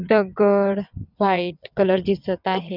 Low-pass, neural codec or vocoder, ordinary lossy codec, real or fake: 5.4 kHz; vocoder, 22.05 kHz, 80 mel bands, WaveNeXt; none; fake